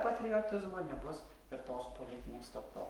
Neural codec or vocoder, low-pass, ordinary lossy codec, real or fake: codec, 44.1 kHz, 7.8 kbps, Pupu-Codec; 19.8 kHz; Opus, 32 kbps; fake